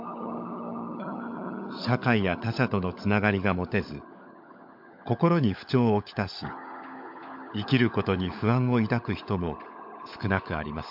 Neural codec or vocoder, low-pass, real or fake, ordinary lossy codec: codec, 16 kHz, 16 kbps, FunCodec, trained on LibriTTS, 50 frames a second; 5.4 kHz; fake; MP3, 48 kbps